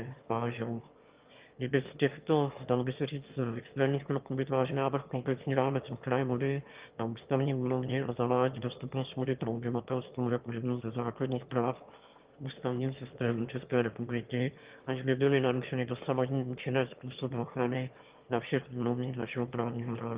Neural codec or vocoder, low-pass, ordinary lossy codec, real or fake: autoencoder, 22.05 kHz, a latent of 192 numbers a frame, VITS, trained on one speaker; 3.6 kHz; Opus, 32 kbps; fake